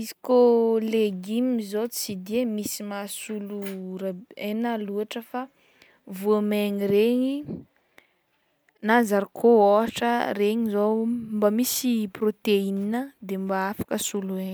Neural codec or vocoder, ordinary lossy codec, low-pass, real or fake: none; none; none; real